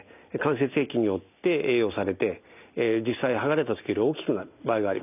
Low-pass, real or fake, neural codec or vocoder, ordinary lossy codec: 3.6 kHz; real; none; none